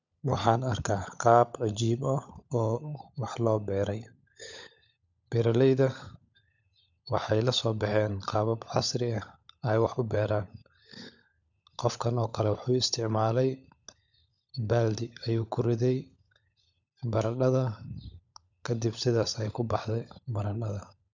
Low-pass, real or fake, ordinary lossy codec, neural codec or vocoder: 7.2 kHz; fake; none; codec, 16 kHz, 16 kbps, FunCodec, trained on LibriTTS, 50 frames a second